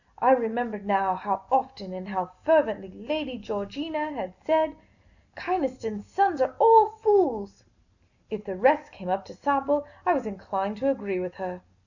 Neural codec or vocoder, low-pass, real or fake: none; 7.2 kHz; real